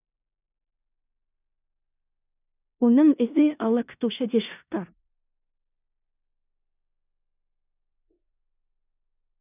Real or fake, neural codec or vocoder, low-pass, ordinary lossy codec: fake; codec, 16 kHz in and 24 kHz out, 0.4 kbps, LongCat-Audio-Codec, four codebook decoder; 3.6 kHz; none